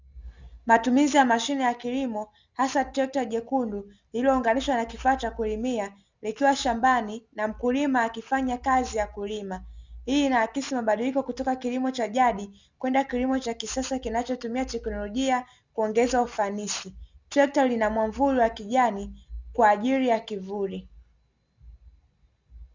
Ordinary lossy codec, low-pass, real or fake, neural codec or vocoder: Opus, 64 kbps; 7.2 kHz; real; none